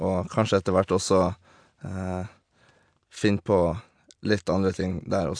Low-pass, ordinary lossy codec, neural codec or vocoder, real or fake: 9.9 kHz; AAC, 64 kbps; none; real